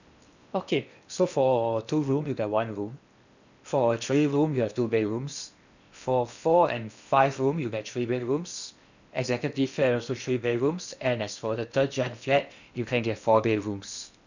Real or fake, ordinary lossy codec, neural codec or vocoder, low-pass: fake; none; codec, 16 kHz in and 24 kHz out, 0.8 kbps, FocalCodec, streaming, 65536 codes; 7.2 kHz